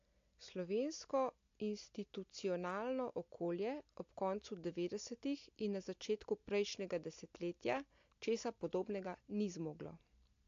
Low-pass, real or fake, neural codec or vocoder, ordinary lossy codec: 7.2 kHz; real; none; AAC, 48 kbps